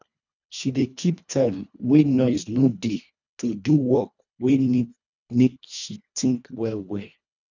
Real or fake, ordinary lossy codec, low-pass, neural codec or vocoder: fake; none; 7.2 kHz; codec, 24 kHz, 1.5 kbps, HILCodec